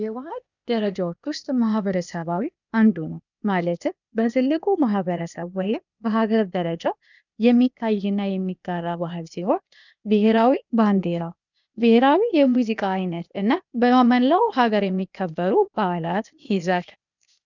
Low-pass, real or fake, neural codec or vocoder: 7.2 kHz; fake; codec, 16 kHz, 0.8 kbps, ZipCodec